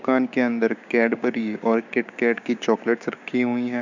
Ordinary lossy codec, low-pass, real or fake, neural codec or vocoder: none; 7.2 kHz; fake; codec, 16 kHz, 6 kbps, DAC